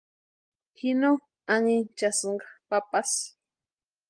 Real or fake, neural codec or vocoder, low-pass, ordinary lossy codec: real; none; 9.9 kHz; Opus, 24 kbps